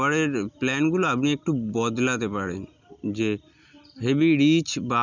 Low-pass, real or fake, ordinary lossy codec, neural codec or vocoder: 7.2 kHz; real; none; none